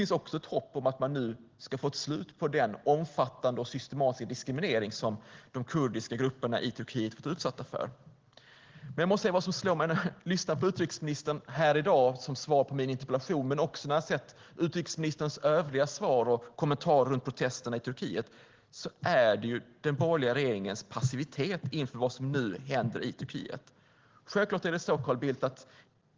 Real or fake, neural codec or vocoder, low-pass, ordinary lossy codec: real; none; 7.2 kHz; Opus, 16 kbps